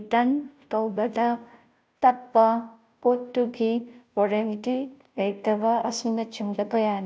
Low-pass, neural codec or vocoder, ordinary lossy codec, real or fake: none; codec, 16 kHz, 0.5 kbps, FunCodec, trained on Chinese and English, 25 frames a second; none; fake